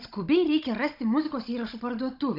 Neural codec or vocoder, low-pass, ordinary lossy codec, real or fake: codec, 16 kHz, 16 kbps, FunCodec, trained on Chinese and English, 50 frames a second; 5.4 kHz; Opus, 64 kbps; fake